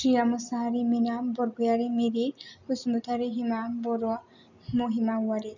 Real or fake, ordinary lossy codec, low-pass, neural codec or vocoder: real; none; 7.2 kHz; none